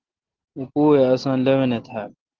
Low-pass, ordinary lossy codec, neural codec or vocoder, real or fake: 7.2 kHz; Opus, 16 kbps; none; real